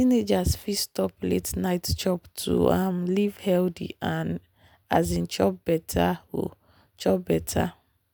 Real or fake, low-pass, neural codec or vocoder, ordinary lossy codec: real; none; none; none